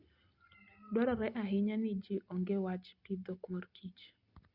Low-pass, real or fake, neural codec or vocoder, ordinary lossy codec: 5.4 kHz; real; none; Opus, 64 kbps